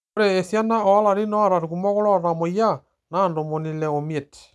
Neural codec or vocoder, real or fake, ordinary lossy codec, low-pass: none; real; none; none